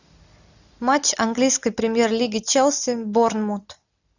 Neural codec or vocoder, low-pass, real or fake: none; 7.2 kHz; real